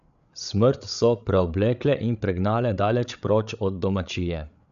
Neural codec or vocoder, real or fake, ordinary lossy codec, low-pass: codec, 16 kHz, 8 kbps, FreqCodec, larger model; fake; none; 7.2 kHz